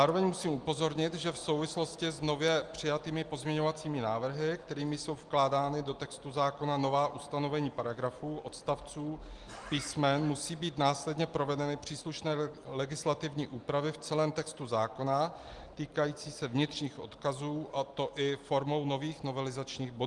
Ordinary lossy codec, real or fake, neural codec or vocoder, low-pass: Opus, 24 kbps; real; none; 10.8 kHz